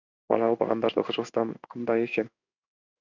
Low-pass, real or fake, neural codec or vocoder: 7.2 kHz; fake; codec, 16 kHz in and 24 kHz out, 1 kbps, XY-Tokenizer